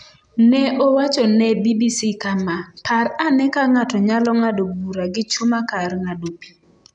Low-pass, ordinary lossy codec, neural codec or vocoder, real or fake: 9.9 kHz; none; none; real